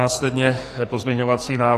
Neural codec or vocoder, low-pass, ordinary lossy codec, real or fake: codec, 44.1 kHz, 2.6 kbps, SNAC; 14.4 kHz; AAC, 48 kbps; fake